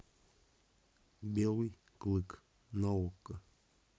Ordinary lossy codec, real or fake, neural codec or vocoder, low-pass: none; real; none; none